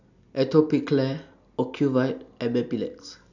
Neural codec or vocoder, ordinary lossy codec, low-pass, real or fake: none; none; 7.2 kHz; real